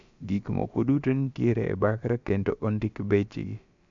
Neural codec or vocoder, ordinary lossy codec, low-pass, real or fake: codec, 16 kHz, about 1 kbps, DyCAST, with the encoder's durations; MP3, 64 kbps; 7.2 kHz; fake